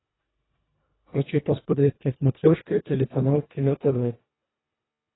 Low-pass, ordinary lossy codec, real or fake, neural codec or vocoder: 7.2 kHz; AAC, 16 kbps; fake; codec, 24 kHz, 1.5 kbps, HILCodec